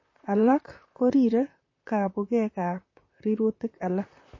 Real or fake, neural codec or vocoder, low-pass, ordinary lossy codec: fake; vocoder, 44.1 kHz, 128 mel bands, Pupu-Vocoder; 7.2 kHz; MP3, 32 kbps